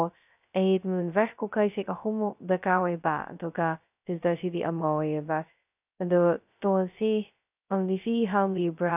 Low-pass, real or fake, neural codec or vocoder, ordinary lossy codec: 3.6 kHz; fake; codec, 16 kHz, 0.2 kbps, FocalCodec; none